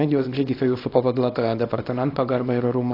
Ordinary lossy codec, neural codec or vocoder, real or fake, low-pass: AAC, 32 kbps; codec, 24 kHz, 0.9 kbps, WavTokenizer, small release; fake; 5.4 kHz